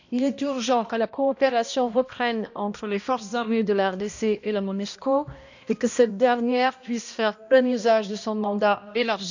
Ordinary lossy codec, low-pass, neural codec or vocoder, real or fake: none; 7.2 kHz; codec, 16 kHz, 1 kbps, X-Codec, HuBERT features, trained on balanced general audio; fake